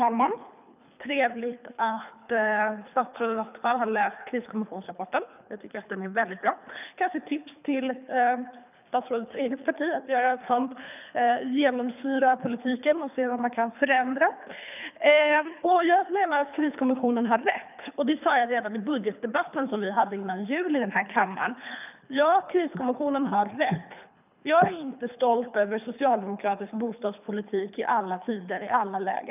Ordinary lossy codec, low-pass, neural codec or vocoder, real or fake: none; 3.6 kHz; codec, 24 kHz, 3 kbps, HILCodec; fake